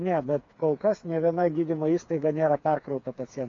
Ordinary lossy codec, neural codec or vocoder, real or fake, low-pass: AAC, 48 kbps; codec, 16 kHz, 4 kbps, FreqCodec, smaller model; fake; 7.2 kHz